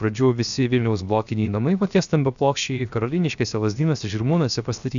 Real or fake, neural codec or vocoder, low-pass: fake; codec, 16 kHz, 0.7 kbps, FocalCodec; 7.2 kHz